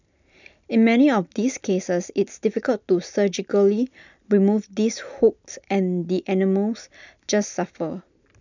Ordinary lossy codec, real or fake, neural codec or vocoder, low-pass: none; real; none; 7.2 kHz